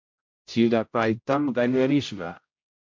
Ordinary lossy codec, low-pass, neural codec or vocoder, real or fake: MP3, 48 kbps; 7.2 kHz; codec, 16 kHz, 0.5 kbps, X-Codec, HuBERT features, trained on general audio; fake